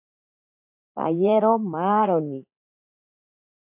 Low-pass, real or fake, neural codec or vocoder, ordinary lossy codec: 3.6 kHz; fake; vocoder, 44.1 kHz, 80 mel bands, Vocos; AAC, 32 kbps